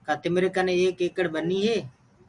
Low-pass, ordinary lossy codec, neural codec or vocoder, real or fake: 10.8 kHz; Opus, 64 kbps; none; real